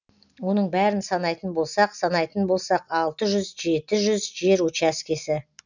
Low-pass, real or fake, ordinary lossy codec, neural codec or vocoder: 7.2 kHz; real; none; none